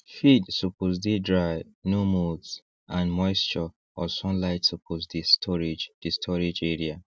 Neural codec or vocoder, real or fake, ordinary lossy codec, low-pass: none; real; none; none